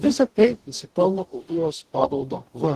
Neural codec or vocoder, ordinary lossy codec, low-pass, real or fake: codec, 44.1 kHz, 0.9 kbps, DAC; Opus, 16 kbps; 14.4 kHz; fake